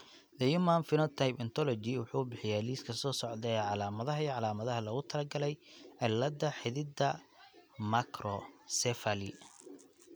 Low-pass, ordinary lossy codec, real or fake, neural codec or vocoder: none; none; real; none